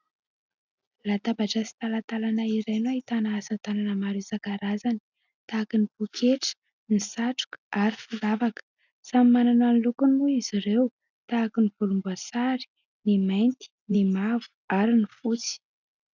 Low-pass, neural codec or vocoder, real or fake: 7.2 kHz; none; real